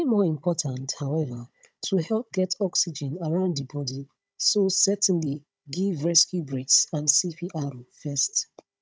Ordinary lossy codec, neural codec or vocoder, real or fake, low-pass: none; codec, 16 kHz, 16 kbps, FunCodec, trained on Chinese and English, 50 frames a second; fake; none